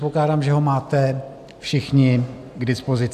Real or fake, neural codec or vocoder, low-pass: real; none; 14.4 kHz